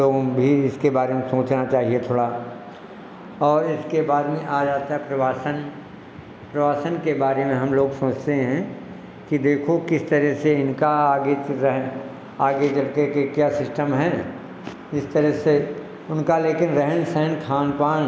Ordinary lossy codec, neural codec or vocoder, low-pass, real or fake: none; none; none; real